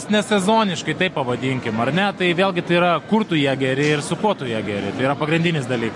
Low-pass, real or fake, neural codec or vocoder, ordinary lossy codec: 10.8 kHz; real; none; MP3, 48 kbps